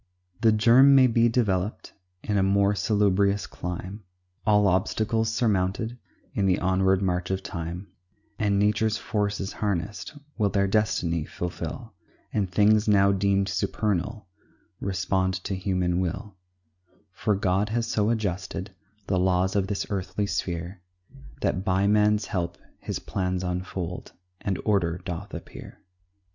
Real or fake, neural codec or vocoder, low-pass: real; none; 7.2 kHz